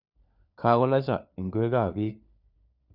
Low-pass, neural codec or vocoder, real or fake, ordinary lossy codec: 5.4 kHz; codec, 16 kHz, 4 kbps, FunCodec, trained on LibriTTS, 50 frames a second; fake; none